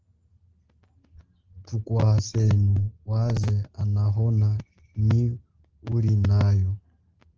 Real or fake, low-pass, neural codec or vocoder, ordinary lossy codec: real; 7.2 kHz; none; Opus, 16 kbps